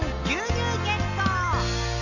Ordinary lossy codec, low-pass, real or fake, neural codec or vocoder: none; 7.2 kHz; real; none